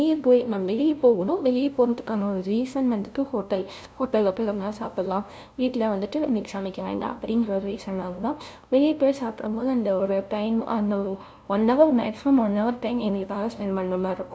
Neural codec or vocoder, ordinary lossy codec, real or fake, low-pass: codec, 16 kHz, 0.5 kbps, FunCodec, trained on LibriTTS, 25 frames a second; none; fake; none